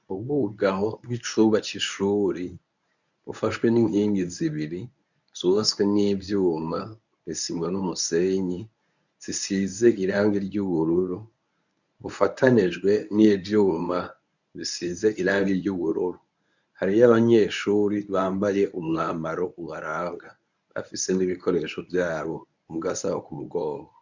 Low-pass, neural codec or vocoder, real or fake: 7.2 kHz; codec, 24 kHz, 0.9 kbps, WavTokenizer, medium speech release version 1; fake